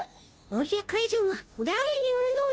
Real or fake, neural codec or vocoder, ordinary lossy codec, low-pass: fake; codec, 16 kHz, 0.5 kbps, FunCodec, trained on Chinese and English, 25 frames a second; none; none